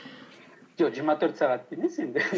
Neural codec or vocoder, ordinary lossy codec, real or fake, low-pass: none; none; real; none